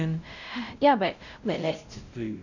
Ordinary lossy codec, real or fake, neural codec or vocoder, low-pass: none; fake; codec, 16 kHz, 0.5 kbps, X-Codec, WavLM features, trained on Multilingual LibriSpeech; 7.2 kHz